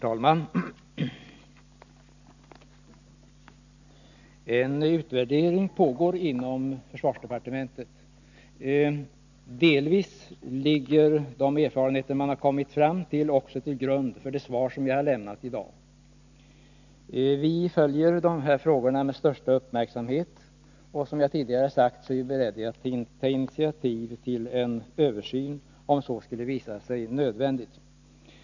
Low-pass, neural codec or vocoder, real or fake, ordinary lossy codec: 7.2 kHz; none; real; none